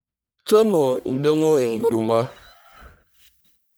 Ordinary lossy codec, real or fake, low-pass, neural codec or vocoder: none; fake; none; codec, 44.1 kHz, 1.7 kbps, Pupu-Codec